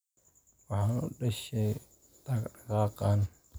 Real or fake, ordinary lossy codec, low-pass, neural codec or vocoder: real; none; none; none